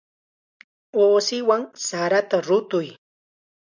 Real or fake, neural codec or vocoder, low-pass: real; none; 7.2 kHz